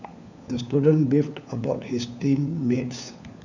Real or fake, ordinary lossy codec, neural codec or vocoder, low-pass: fake; none; codec, 16 kHz, 4 kbps, FunCodec, trained on LibriTTS, 50 frames a second; 7.2 kHz